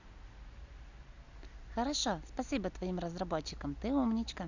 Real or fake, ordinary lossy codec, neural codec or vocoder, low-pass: real; Opus, 64 kbps; none; 7.2 kHz